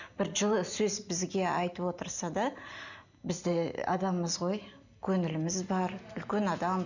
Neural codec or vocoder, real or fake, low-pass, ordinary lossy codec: none; real; 7.2 kHz; none